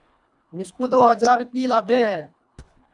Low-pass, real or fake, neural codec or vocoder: 10.8 kHz; fake; codec, 24 kHz, 1.5 kbps, HILCodec